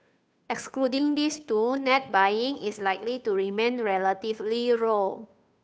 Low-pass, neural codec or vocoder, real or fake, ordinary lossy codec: none; codec, 16 kHz, 2 kbps, FunCodec, trained on Chinese and English, 25 frames a second; fake; none